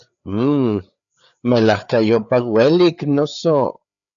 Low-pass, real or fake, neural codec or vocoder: 7.2 kHz; fake; codec, 16 kHz, 4 kbps, FreqCodec, larger model